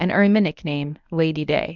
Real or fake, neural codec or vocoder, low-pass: fake; codec, 24 kHz, 0.9 kbps, WavTokenizer, medium speech release version 1; 7.2 kHz